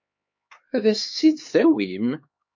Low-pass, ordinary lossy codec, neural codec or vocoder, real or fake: 7.2 kHz; MP3, 48 kbps; codec, 16 kHz, 4 kbps, X-Codec, HuBERT features, trained on LibriSpeech; fake